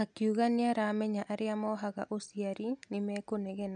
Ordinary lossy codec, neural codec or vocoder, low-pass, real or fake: none; none; 9.9 kHz; real